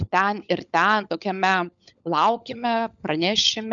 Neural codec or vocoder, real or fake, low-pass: codec, 16 kHz, 16 kbps, FunCodec, trained on LibriTTS, 50 frames a second; fake; 7.2 kHz